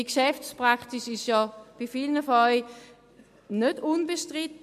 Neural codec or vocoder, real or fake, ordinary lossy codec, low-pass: none; real; MP3, 64 kbps; 14.4 kHz